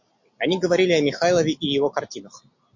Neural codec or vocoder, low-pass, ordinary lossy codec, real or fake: none; 7.2 kHz; MP3, 48 kbps; real